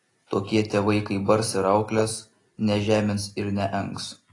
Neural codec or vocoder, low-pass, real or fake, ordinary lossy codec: none; 10.8 kHz; real; AAC, 32 kbps